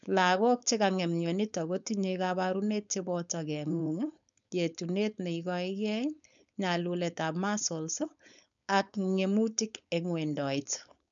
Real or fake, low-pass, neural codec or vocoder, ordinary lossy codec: fake; 7.2 kHz; codec, 16 kHz, 4.8 kbps, FACodec; none